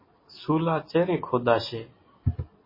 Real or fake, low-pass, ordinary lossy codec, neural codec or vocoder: real; 5.4 kHz; MP3, 24 kbps; none